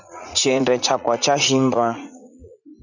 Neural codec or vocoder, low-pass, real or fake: vocoder, 44.1 kHz, 80 mel bands, Vocos; 7.2 kHz; fake